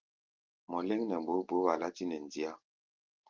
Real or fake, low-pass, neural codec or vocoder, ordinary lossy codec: real; 7.2 kHz; none; Opus, 32 kbps